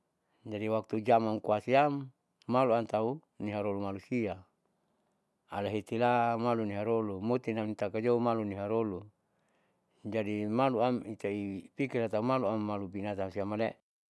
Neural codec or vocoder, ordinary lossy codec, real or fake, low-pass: none; none; real; none